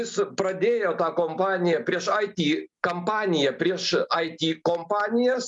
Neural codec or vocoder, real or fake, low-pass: none; real; 10.8 kHz